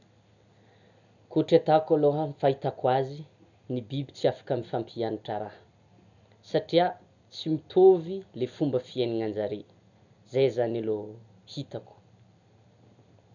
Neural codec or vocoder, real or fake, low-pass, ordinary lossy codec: none; real; 7.2 kHz; none